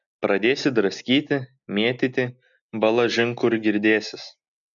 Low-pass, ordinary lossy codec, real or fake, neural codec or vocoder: 7.2 kHz; MP3, 96 kbps; real; none